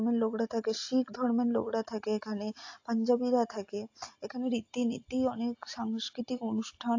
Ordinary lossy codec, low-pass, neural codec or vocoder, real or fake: AAC, 48 kbps; 7.2 kHz; none; real